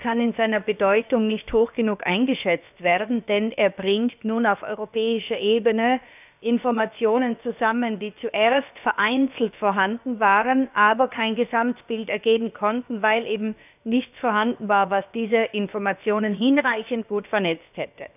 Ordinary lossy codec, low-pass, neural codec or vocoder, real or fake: none; 3.6 kHz; codec, 16 kHz, about 1 kbps, DyCAST, with the encoder's durations; fake